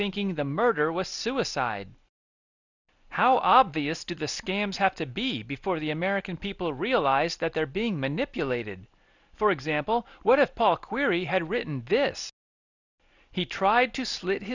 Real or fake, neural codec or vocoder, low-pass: real; none; 7.2 kHz